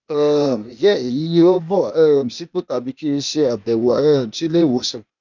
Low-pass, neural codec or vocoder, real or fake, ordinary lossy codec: 7.2 kHz; codec, 16 kHz, 0.8 kbps, ZipCodec; fake; none